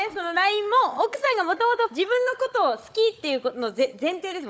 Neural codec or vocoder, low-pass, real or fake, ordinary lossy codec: codec, 16 kHz, 16 kbps, FunCodec, trained on Chinese and English, 50 frames a second; none; fake; none